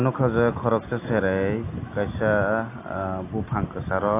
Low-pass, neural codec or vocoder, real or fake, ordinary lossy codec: 3.6 kHz; none; real; AAC, 24 kbps